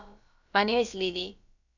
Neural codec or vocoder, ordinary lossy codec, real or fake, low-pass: codec, 16 kHz, about 1 kbps, DyCAST, with the encoder's durations; none; fake; 7.2 kHz